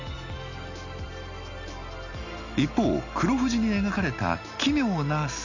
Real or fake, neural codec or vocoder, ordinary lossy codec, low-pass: real; none; none; 7.2 kHz